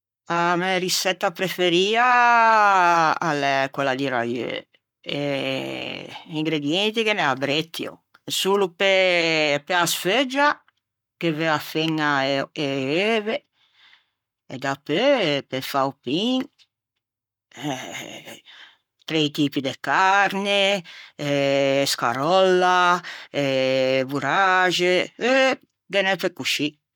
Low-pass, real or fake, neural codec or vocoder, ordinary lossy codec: 19.8 kHz; fake; codec, 44.1 kHz, 7.8 kbps, Pupu-Codec; none